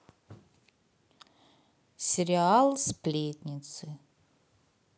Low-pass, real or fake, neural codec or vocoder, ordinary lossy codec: none; real; none; none